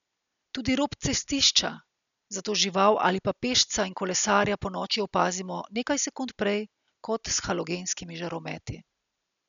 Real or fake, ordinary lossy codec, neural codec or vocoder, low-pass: real; none; none; 7.2 kHz